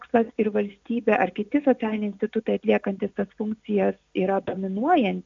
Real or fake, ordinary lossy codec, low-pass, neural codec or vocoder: real; AAC, 64 kbps; 7.2 kHz; none